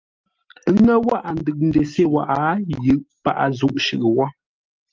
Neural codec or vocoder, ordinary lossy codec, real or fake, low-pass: none; Opus, 24 kbps; real; 7.2 kHz